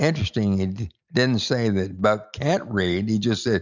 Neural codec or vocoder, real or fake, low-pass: none; real; 7.2 kHz